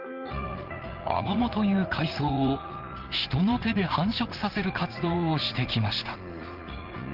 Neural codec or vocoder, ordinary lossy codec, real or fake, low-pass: vocoder, 44.1 kHz, 80 mel bands, Vocos; Opus, 16 kbps; fake; 5.4 kHz